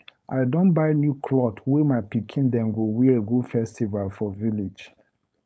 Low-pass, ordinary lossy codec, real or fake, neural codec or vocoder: none; none; fake; codec, 16 kHz, 4.8 kbps, FACodec